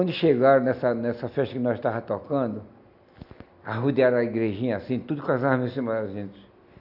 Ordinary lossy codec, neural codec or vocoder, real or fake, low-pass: none; none; real; 5.4 kHz